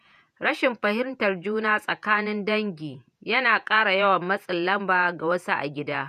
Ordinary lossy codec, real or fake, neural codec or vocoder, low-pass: none; fake; vocoder, 48 kHz, 128 mel bands, Vocos; 14.4 kHz